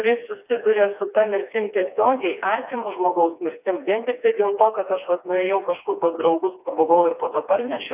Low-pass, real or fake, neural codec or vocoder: 3.6 kHz; fake; codec, 16 kHz, 2 kbps, FreqCodec, smaller model